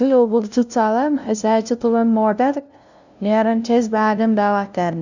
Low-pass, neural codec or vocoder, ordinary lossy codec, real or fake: 7.2 kHz; codec, 16 kHz, 0.5 kbps, FunCodec, trained on LibriTTS, 25 frames a second; none; fake